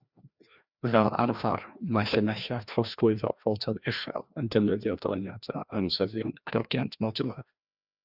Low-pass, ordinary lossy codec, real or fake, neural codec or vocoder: 5.4 kHz; Opus, 64 kbps; fake; codec, 16 kHz, 1 kbps, FreqCodec, larger model